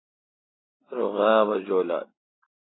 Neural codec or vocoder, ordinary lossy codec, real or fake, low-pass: none; AAC, 16 kbps; real; 7.2 kHz